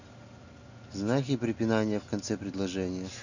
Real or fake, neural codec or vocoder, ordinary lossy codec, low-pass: real; none; none; 7.2 kHz